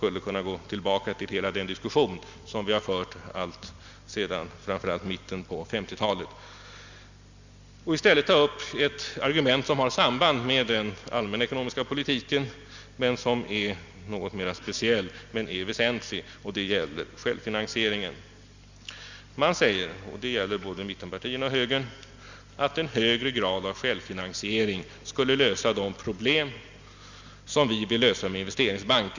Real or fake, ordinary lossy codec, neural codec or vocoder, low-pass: real; Opus, 64 kbps; none; 7.2 kHz